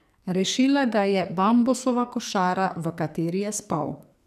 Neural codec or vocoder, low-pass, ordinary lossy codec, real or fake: codec, 44.1 kHz, 2.6 kbps, SNAC; 14.4 kHz; none; fake